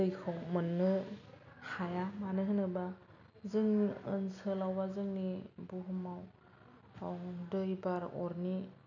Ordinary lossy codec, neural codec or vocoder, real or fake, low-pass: none; none; real; 7.2 kHz